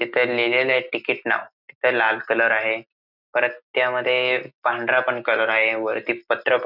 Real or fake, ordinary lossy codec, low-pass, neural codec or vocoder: real; none; 5.4 kHz; none